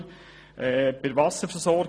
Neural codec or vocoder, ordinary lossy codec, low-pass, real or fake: none; none; none; real